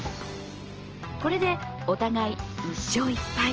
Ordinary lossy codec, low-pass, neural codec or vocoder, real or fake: Opus, 16 kbps; 7.2 kHz; none; real